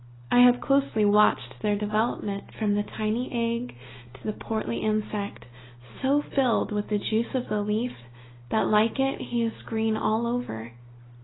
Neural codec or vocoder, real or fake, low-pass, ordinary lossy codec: none; real; 7.2 kHz; AAC, 16 kbps